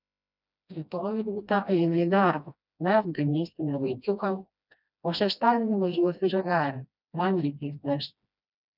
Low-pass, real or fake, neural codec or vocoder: 5.4 kHz; fake; codec, 16 kHz, 1 kbps, FreqCodec, smaller model